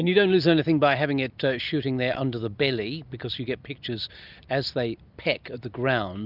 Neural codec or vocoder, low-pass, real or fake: none; 5.4 kHz; real